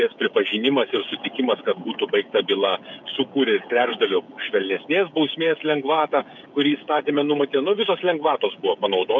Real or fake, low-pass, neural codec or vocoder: fake; 7.2 kHz; codec, 16 kHz, 16 kbps, FreqCodec, smaller model